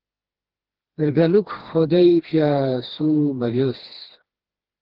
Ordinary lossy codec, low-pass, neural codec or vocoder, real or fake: Opus, 16 kbps; 5.4 kHz; codec, 16 kHz, 2 kbps, FreqCodec, smaller model; fake